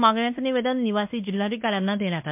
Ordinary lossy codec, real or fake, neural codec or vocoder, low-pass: MP3, 32 kbps; fake; codec, 16 kHz in and 24 kHz out, 0.9 kbps, LongCat-Audio-Codec, fine tuned four codebook decoder; 3.6 kHz